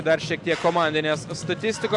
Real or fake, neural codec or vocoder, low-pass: real; none; 10.8 kHz